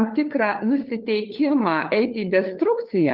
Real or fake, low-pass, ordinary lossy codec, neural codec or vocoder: fake; 5.4 kHz; Opus, 32 kbps; codec, 16 kHz, 4 kbps, FunCodec, trained on LibriTTS, 50 frames a second